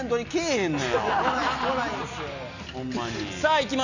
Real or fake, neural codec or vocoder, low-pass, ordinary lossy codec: real; none; 7.2 kHz; none